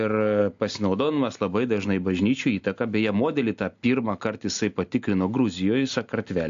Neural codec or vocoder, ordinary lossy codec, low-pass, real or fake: none; AAC, 64 kbps; 7.2 kHz; real